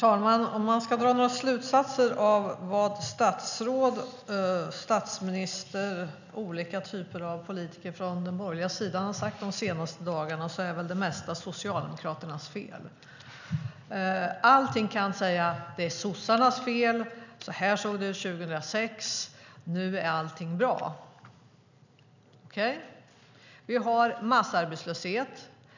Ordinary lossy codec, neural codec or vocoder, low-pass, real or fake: none; none; 7.2 kHz; real